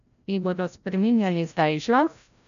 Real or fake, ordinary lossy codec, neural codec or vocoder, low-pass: fake; none; codec, 16 kHz, 0.5 kbps, FreqCodec, larger model; 7.2 kHz